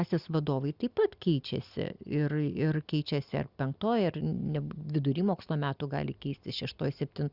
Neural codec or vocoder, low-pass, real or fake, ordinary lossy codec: none; 5.4 kHz; real; Opus, 64 kbps